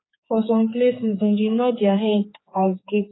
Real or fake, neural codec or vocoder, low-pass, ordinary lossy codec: fake; codec, 16 kHz, 4 kbps, X-Codec, HuBERT features, trained on general audio; 7.2 kHz; AAC, 16 kbps